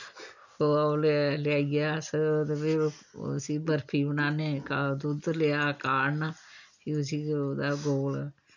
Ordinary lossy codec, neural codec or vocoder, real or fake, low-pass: none; none; real; 7.2 kHz